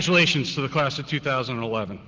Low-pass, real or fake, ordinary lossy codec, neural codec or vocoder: 7.2 kHz; real; Opus, 16 kbps; none